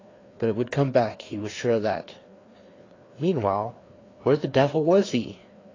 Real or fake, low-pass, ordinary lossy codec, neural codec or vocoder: fake; 7.2 kHz; AAC, 32 kbps; codec, 16 kHz, 2 kbps, FreqCodec, larger model